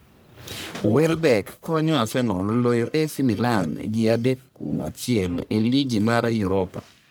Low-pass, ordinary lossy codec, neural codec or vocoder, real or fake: none; none; codec, 44.1 kHz, 1.7 kbps, Pupu-Codec; fake